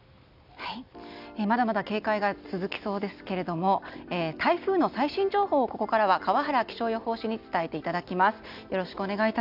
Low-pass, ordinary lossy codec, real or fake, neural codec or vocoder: 5.4 kHz; none; real; none